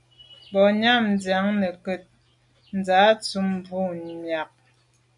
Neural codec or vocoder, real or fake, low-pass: none; real; 10.8 kHz